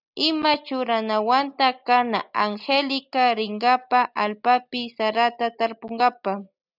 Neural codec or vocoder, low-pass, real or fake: none; 5.4 kHz; real